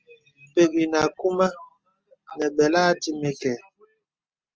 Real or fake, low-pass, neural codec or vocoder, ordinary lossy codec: real; 7.2 kHz; none; Opus, 24 kbps